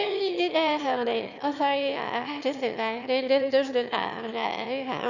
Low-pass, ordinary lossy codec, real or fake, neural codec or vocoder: 7.2 kHz; none; fake; autoencoder, 22.05 kHz, a latent of 192 numbers a frame, VITS, trained on one speaker